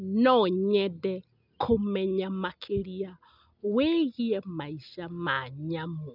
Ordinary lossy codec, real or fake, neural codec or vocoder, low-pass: none; real; none; 5.4 kHz